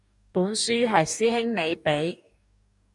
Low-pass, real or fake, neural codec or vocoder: 10.8 kHz; fake; codec, 44.1 kHz, 2.6 kbps, DAC